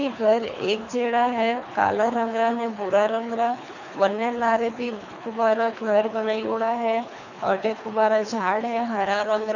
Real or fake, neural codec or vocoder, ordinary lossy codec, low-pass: fake; codec, 24 kHz, 3 kbps, HILCodec; none; 7.2 kHz